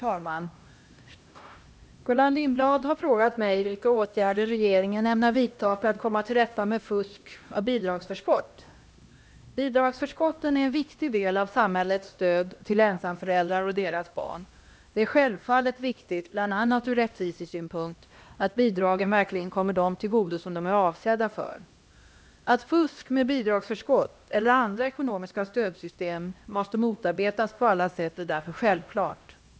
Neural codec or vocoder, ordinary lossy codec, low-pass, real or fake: codec, 16 kHz, 1 kbps, X-Codec, HuBERT features, trained on LibriSpeech; none; none; fake